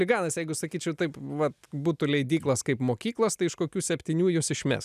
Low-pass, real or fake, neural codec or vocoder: 14.4 kHz; real; none